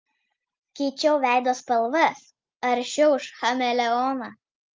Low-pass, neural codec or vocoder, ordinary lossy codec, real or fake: 7.2 kHz; none; Opus, 32 kbps; real